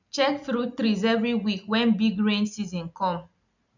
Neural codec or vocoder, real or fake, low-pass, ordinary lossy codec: none; real; 7.2 kHz; none